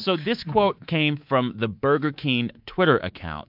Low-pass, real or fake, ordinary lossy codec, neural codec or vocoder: 5.4 kHz; fake; MP3, 48 kbps; codec, 24 kHz, 3.1 kbps, DualCodec